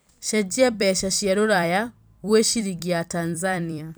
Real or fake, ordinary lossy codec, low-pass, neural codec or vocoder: fake; none; none; vocoder, 44.1 kHz, 128 mel bands every 256 samples, BigVGAN v2